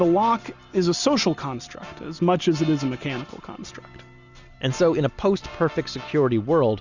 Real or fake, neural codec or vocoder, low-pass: real; none; 7.2 kHz